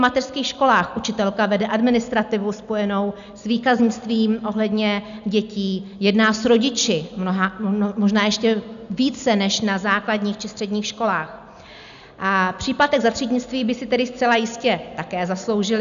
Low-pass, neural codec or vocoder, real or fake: 7.2 kHz; none; real